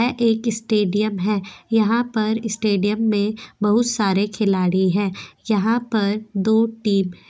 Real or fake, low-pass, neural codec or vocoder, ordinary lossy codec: real; none; none; none